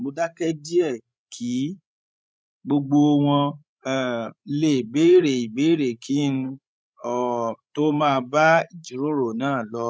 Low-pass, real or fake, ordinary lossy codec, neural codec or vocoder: none; fake; none; codec, 16 kHz, 16 kbps, FreqCodec, larger model